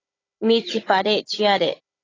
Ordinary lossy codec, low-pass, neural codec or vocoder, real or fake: AAC, 32 kbps; 7.2 kHz; codec, 16 kHz, 16 kbps, FunCodec, trained on Chinese and English, 50 frames a second; fake